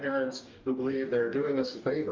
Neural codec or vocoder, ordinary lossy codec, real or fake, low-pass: codec, 44.1 kHz, 2.6 kbps, DAC; Opus, 24 kbps; fake; 7.2 kHz